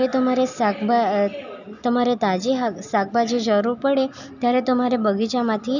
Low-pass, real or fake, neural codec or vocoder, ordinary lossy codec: 7.2 kHz; real; none; none